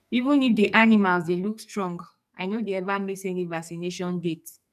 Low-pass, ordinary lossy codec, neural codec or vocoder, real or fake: 14.4 kHz; none; codec, 44.1 kHz, 2.6 kbps, SNAC; fake